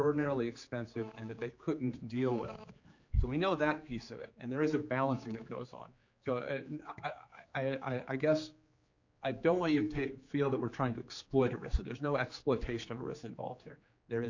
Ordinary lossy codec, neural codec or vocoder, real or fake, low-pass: Opus, 64 kbps; codec, 16 kHz, 4 kbps, X-Codec, HuBERT features, trained on general audio; fake; 7.2 kHz